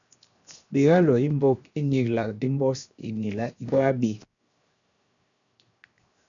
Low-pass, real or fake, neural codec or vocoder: 7.2 kHz; fake; codec, 16 kHz, 0.7 kbps, FocalCodec